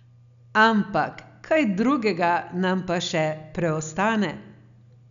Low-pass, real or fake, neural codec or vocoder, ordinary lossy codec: 7.2 kHz; real; none; none